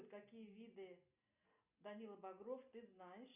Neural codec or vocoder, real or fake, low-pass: none; real; 3.6 kHz